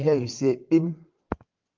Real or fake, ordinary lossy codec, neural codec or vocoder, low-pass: fake; Opus, 32 kbps; vocoder, 22.05 kHz, 80 mel bands, WaveNeXt; 7.2 kHz